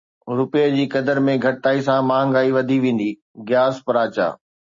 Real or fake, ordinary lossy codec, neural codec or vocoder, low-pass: real; MP3, 32 kbps; none; 7.2 kHz